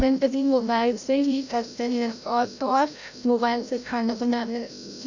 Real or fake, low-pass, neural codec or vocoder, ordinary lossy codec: fake; 7.2 kHz; codec, 16 kHz, 0.5 kbps, FreqCodec, larger model; none